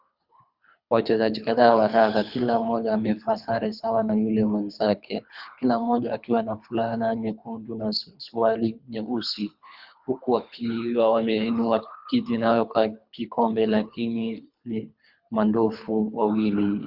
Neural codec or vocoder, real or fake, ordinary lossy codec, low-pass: codec, 24 kHz, 3 kbps, HILCodec; fake; Opus, 64 kbps; 5.4 kHz